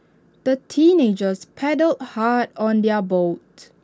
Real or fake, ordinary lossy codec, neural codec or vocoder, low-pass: real; none; none; none